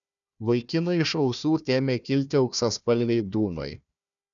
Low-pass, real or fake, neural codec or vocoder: 7.2 kHz; fake; codec, 16 kHz, 1 kbps, FunCodec, trained on Chinese and English, 50 frames a second